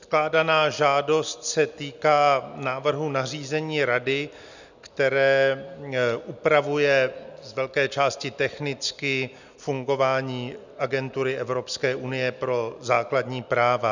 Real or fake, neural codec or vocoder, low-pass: real; none; 7.2 kHz